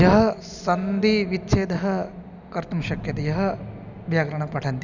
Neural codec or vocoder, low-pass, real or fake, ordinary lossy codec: none; 7.2 kHz; real; none